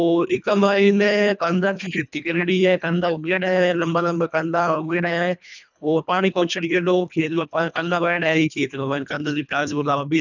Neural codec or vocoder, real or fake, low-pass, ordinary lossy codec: codec, 24 kHz, 1.5 kbps, HILCodec; fake; 7.2 kHz; none